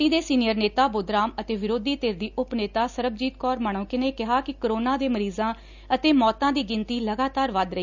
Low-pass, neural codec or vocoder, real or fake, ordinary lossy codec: 7.2 kHz; none; real; none